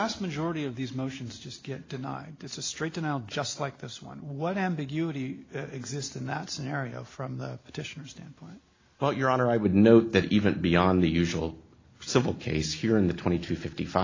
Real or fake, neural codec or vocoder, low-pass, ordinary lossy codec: real; none; 7.2 kHz; AAC, 32 kbps